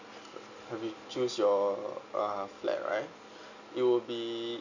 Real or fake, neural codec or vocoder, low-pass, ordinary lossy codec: real; none; 7.2 kHz; none